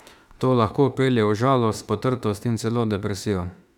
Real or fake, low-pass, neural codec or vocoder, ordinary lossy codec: fake; 19.8 kHz; autoencoder, 48 kHz, 32 numbers a frame, DAC-VAE, trained on Japanese speech; none